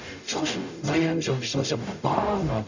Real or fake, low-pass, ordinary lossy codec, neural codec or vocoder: fake; 7.2 kHz; none; codec, 44.1 kHz, 0.9 kbps, DAC